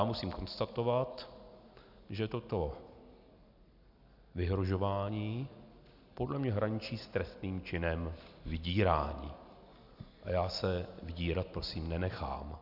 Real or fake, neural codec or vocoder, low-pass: real; none; 5.4 kHz